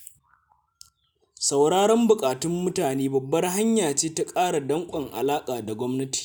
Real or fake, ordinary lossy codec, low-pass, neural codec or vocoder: real; none; none; none